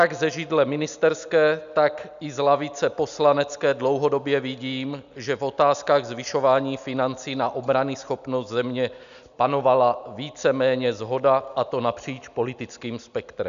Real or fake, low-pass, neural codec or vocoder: real; 7.2 kHz; none